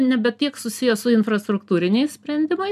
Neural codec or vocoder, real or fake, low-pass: none; real; 14.4 kHz